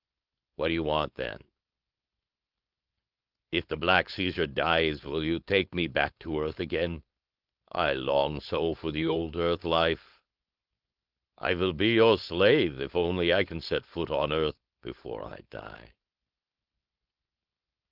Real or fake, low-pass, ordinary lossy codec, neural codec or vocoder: fake; 5.4 kHz; Opus, 32 kbps; codec, 16 kHz, 4.8 kbps, FACodec